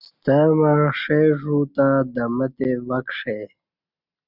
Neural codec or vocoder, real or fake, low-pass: none; real; 5.4 kHz